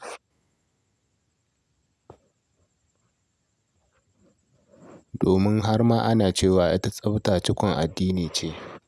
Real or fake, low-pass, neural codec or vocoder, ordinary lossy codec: real; none; none; none